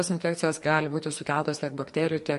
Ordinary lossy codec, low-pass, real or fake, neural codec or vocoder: MP3, 48 kbps; 14.4 kHz; fake; codec, 44.1 kHz, 2.6 kbps, SNAC